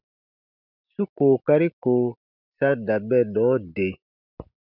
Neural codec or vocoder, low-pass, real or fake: none; 5.4 kHz; real